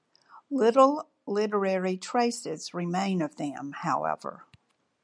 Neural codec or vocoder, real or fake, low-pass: none; real; 9.9 kHz